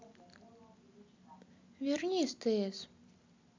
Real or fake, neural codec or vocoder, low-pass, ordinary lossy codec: real; none; 7.2 kHz; MP3, 64 kbps